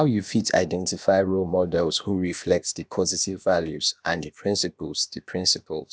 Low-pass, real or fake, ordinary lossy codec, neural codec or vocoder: none; fake; none; codec, 16 kHz, about 1 kbps, DyCAST, with the encoder's durations